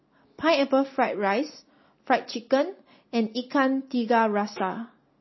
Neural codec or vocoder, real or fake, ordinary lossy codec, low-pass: none; real; MP3, 24 kbps; 7.2 kHz